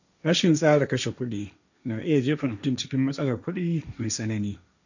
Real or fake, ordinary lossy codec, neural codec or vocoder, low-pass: fake; none; codec, 16 kHz, 1.1 kbps, Voila-Tokenizer; 7.2 kHz